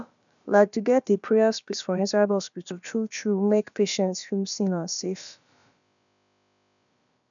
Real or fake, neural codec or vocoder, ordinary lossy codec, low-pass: fake; codec, 16 kHz, about 1 kbps, DyCAST, with the encoder's durations; none; 7.2 kHz